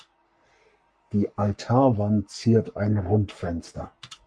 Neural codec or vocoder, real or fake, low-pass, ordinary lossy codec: codec, 44.1 kHz, 3.4 kbps, Pupu-Codec; fake; 9.9 kHz; MP3, 64 kbps